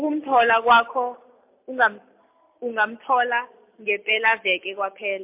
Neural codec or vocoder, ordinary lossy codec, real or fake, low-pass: none; MP3, 32 kbps; real; 3.6 kHz